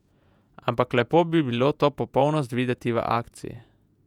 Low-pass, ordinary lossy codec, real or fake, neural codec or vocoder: 19.8 kHz; none; fake; vocoder, 48 kHz, 128 mel bands, Vocos